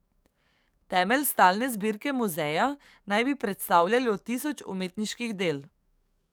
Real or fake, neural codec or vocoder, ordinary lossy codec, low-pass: fake; codec, 44.1 kHz, 7.8 kbps, DAC; none; none